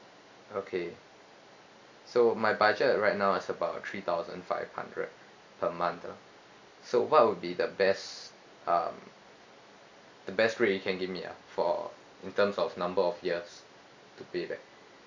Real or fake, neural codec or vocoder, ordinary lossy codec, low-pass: real; none; AAC, 48 kbps; 7.2 kHz